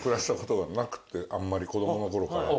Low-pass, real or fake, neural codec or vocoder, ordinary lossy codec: none; real; none; none